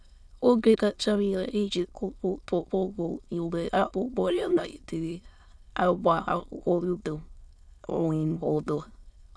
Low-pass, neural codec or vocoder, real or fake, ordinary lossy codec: none; autoencoder, 22.05 kHz, a latent of 192 numbers a frame, VITS, trained on many speakers; fake; none